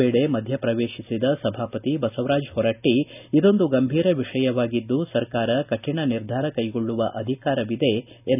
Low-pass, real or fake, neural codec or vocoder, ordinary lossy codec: 3.6 kHz; real; none; none